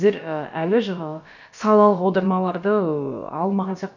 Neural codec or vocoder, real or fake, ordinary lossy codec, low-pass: codec, 16 kHz, about 1 kbps, DyCAST, with the encoder's durations; fake; none; 7.2 kHz